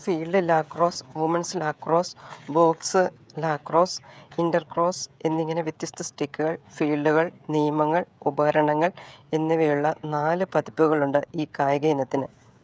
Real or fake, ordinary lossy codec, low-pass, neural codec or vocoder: fake; none; none; codec, 16 kHz, 16 kbps, FreqCodec, smaller model